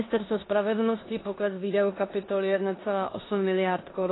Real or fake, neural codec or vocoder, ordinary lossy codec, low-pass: fake; codec, 16 kHz in and 24 kHz out, 0.9 kbps, LongCat-Audio-Codec, four codebook decoder; AAC, 16 kbps; 7.2 kHz